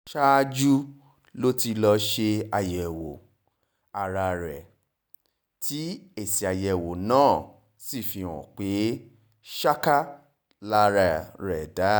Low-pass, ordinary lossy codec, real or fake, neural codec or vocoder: none; none; real; none